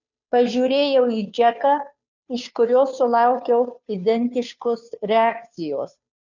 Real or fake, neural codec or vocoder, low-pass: fake; codec, 16 kHz, 2 kbps, FunCodec, trained on Chinese and English, 25 frames a second; 7.2 kHz